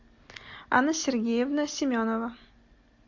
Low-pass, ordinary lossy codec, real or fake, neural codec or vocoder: 7.2 kHz; MP3, 48 kbps; real; none